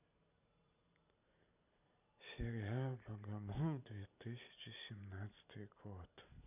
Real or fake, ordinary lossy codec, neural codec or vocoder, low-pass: fake; none; vocoder, 44.1 kHz, 128 mel bands every 512 samples, BigVGAN v2; 3.6 kHz